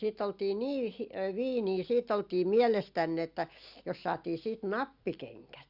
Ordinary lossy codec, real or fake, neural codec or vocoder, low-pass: Opus, 64 kbps; real; none; 5.4 kHz